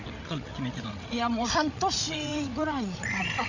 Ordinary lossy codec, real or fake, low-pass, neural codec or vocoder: none; fake; 7.2 kHz; codec, 16 kHz, 16 kbps, FreqCodec, larger model